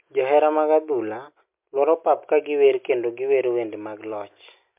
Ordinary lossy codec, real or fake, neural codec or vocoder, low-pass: MP3, 32 kbps; real; none; 3.6 kHz